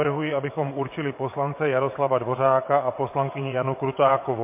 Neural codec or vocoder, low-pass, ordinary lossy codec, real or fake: vocoder, 22.05 kHz, 80 mel bands, WaveNeXt; 3.6 kHz; MP3, 24 kbps; fake